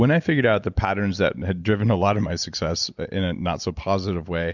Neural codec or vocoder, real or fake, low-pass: none; real; 7.2 kHz